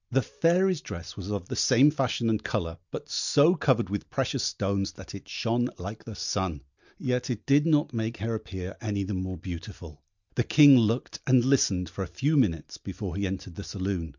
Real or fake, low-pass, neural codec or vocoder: real; 7.2 kHz; none